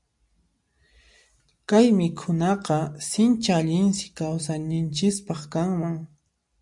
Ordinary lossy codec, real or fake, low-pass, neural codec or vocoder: MP3, 96 kbps; real; 10.8 kHz; none